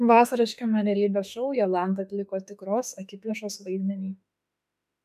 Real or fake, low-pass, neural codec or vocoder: fake; 14.4 kHz; autoencoder, 48 kHz, 32 numbers a frame, DAC-VAE, trained on Japanese speech